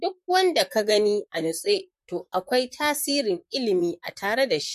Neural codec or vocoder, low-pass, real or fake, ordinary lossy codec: vocoder, 44.1 kHz, 128 mel bands, Pupu-Vocoder; 14.4 kHz; fake; MP3, 64 kbps